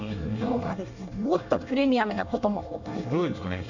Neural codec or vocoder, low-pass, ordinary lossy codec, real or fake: codec, 24 kHz, 1 kbps, SNAC; 7.2 kHz; none; fake